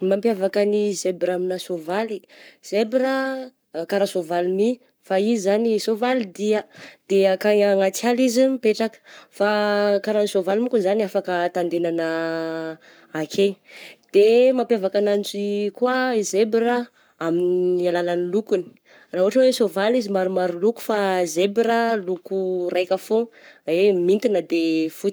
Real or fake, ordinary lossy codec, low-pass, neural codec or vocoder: fake; none; none; codec, 44.1 kHz, 7.8 kbps, Pupu-Codec